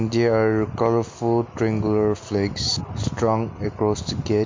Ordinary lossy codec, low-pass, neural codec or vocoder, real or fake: MP3, 48 kbps; 7.2 kHz; none; real